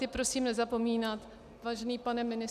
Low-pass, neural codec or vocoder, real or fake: 14.4 kHz; none; real